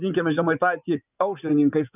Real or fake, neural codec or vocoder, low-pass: fake; vocoder, 24 kHz, 100 mel bands, Vocos; 3.6 kHz